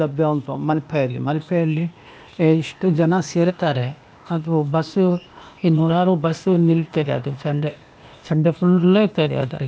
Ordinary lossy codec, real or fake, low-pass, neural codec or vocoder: none; fake; none; codec, 16 kHz, 0.8 kbps, ZipCodec